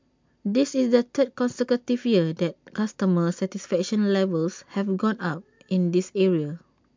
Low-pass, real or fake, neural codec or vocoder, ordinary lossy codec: 7.2 kHz; real; none; MP3, 64 kbps